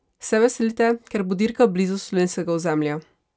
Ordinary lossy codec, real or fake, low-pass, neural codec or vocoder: none; real; none; none